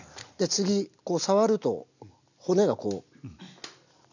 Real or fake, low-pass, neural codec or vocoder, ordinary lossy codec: real; 7.2 kHz; none; none